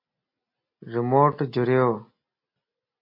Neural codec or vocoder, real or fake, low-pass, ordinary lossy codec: none; real; 5.4 kHz; MP3, 32 kbps